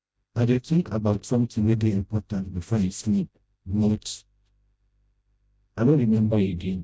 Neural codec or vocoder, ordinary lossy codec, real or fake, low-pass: codec, 16 kHz, 0.5 kbps, FreqCodec, smaller model; none; fake; none